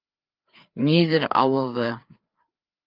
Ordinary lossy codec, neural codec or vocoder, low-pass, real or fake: Opus, 24 kbps; codec, 16 kHz, 4 kbps, FreqCodec, larger model; 5.4 kHz; fake